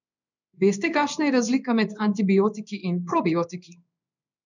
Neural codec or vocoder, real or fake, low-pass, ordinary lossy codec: codec, 16 kHz in and 24 kHz out, 1 kbps, XY-Tokenizer; fake; 7.2 kHz; none